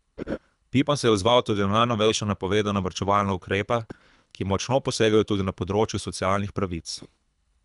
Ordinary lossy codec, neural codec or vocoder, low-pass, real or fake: none; codec, 24 kHz, 3 kbps, HILCodec; 10.8 kHz; fake